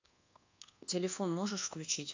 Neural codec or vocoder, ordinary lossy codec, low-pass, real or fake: codec, 24 kHz, 1.2 kbps, DualCodec; AAC, 48 kbps; 7.2 kHz; fake